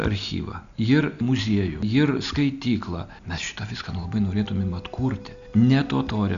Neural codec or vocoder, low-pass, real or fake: none; 7.2 kHz; real